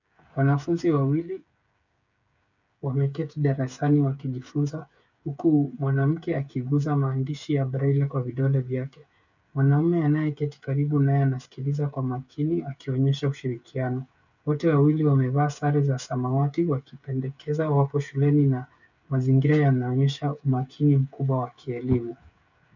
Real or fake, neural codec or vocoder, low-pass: fake; codec, 16 kHz, 8 kbps, FreqCodec, smaller model; 7.2 kHz